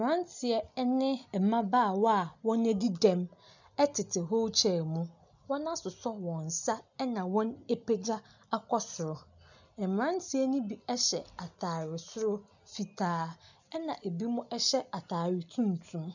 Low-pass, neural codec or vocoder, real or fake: 7.2 kHz; none; real